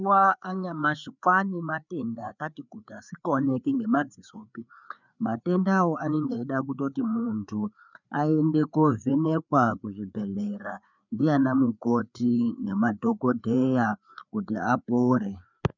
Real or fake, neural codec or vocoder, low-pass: fake; codec, 16 kHz, 4 kbps, FreqCodec, larger model; 7.2 kHz